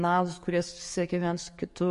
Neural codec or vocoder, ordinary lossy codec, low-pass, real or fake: codec, 44.1 kHz, 7.8 kbps, DAC; MP3, 48 kbps; 14.4 kHz; fake